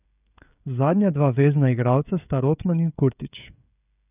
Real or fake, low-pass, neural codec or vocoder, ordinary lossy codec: fake; 3.6 kHz; codec, 16 kHz, 16 kbps, FreqCodec, smaller model; none